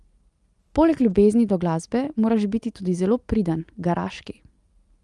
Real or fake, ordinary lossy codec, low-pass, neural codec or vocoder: real; Opus, 32 kbps; 10.8 kHz; none